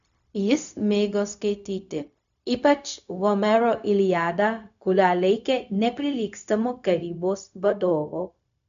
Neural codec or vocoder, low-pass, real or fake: codec, 16 kHz, 0.4 kbps, LongCat-Audio-Codec; 7.2 kHz; fake